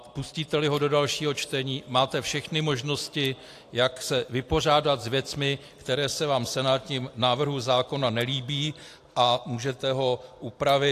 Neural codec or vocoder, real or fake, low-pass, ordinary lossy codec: none; real; 14.4 kHz; AAC, 64 kbps